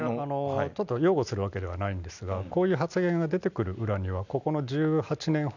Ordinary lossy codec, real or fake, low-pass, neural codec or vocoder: none; real; 7.2 kHz; none